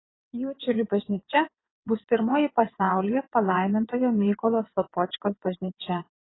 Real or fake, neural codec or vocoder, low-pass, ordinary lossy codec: fake; vocoder, 22.05 kHz, 80 mel bands, WaveNeXt; 7.2 kHz; AAC, 16 kbps